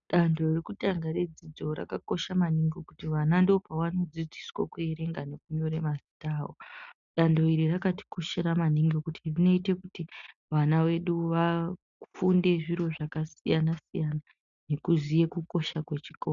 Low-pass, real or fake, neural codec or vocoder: 7.2 kHz; real; none